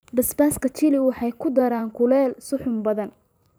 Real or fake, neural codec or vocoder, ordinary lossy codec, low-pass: fake; vocoder, 44.1 kHz, 128 mel bands every 512 samples, BigVGAN v2; none; none